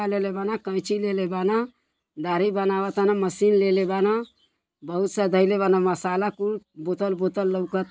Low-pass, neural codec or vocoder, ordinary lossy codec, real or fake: none; none; none; real